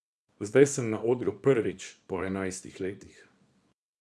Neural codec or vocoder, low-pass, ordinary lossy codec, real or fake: codec, 24 kHz, 0.9 kbps, WavTokenizer, small release; none; none; fake